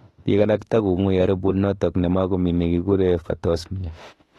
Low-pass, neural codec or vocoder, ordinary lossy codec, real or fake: 19.8 kHz; autoencoder, 48 kHz, 32 numbers a frame, DAC-VAE, trained on Japanese speech; AAC, 32 kbps; fake